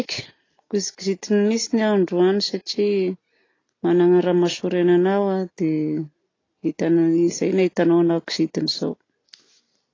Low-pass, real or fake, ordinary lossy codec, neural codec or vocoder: 7.2 kHz; real; AAC, 32 kbps; none